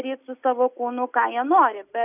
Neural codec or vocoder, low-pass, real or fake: none; 3.6 kHz; real